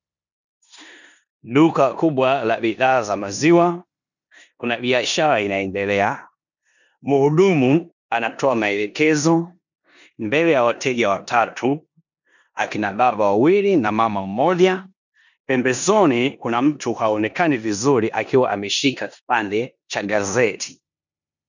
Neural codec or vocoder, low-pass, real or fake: codec, 16 kHz in and 24 kHz out, 0.9 kbps, LongCat-Audio-Codec, four codebook decoder; 7.2 kHz; fake